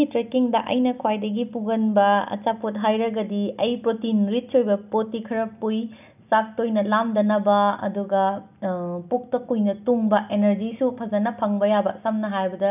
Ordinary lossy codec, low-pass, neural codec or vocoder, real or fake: none; 3.6 kHz; none; real